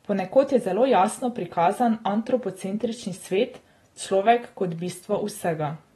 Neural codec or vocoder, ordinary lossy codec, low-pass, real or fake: none; AAC, 32 kbps; 19.8 kHz; real